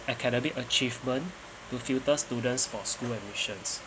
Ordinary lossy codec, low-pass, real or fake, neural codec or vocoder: none; none; real; none